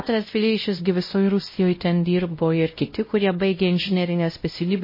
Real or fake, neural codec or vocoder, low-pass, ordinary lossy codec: fake; codec, 16 kHz, 0.5 kbps, X-Codec, WavLM features, trained on Multilingual LibriSpeech; 5.4 kHz; MP3, 24 kbps